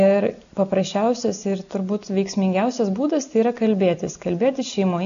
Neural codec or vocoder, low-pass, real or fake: none; 7.2 kHz; real